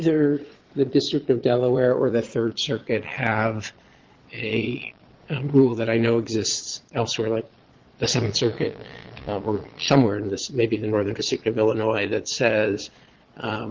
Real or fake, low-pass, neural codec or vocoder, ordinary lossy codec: fake; 7.2 kHz; vocoder, 22.05 kHz, 80 mel bands, Vocos; Opus, 16 kbps